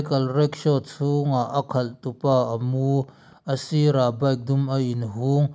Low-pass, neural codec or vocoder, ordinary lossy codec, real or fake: none; none; none; real